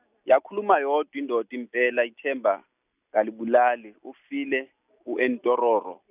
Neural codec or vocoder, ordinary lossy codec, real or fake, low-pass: none; none; real; 3.6 kHz